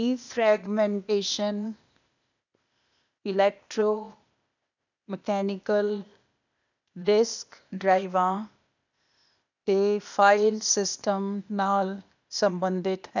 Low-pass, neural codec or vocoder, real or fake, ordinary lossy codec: 7.2 kHz; codec, 16 kHz, 0.8 kbps, ZipCodec; fake; none